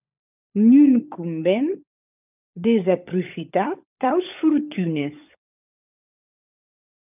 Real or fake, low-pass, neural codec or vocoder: fake; 3.6 kHz; codec, 16 kHz, 16 kbps, FunCodec, trained on LibriTTS, 50 frames a second